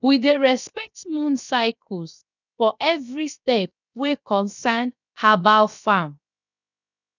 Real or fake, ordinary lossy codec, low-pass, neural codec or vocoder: fake; none; 7.2 kHz; codec, 16 kHz, 0.7 kbps, FocalCodec